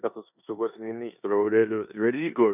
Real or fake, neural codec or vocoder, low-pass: fake; codec, 16 kHz in and 24 kHz out, 0.9 kbps, LongCat-Audio-Codec, four codebook decoder; 3.6 kHz